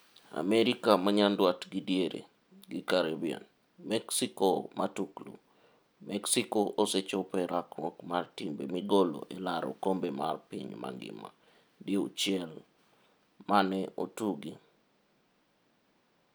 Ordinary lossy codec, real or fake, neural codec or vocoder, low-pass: none; real; none; none